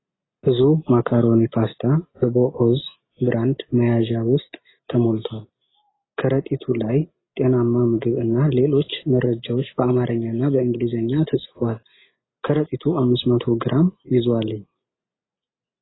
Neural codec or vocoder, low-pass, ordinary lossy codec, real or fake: none; 7.2 kHz; AAC, 16 kbps; real